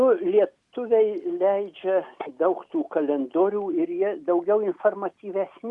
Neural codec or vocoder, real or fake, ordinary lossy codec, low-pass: none; real; Opus, 64 kbps; 10.8 kHz